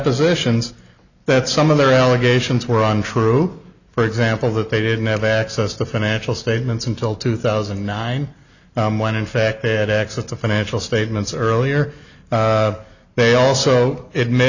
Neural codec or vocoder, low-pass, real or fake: none; 7.2 kHz; real